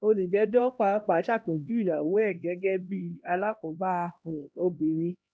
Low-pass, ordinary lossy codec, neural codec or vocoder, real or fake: none; none; codec, 16 kHz, 1 kbps, X-Codec, HuBERT features, trained on LibriSpeech; fake